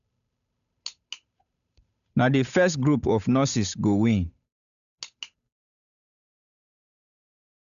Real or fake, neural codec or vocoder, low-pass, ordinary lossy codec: fake; codec, 16 kHz, 8 kbps, FunCodec, trained on Chinese and English, 25 frames a second; 7.2 kHz; none